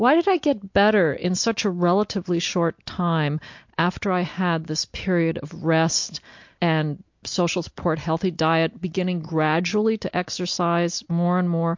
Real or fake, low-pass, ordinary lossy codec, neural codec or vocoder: real; 7.2 kHz; MP3, 48 kbps; none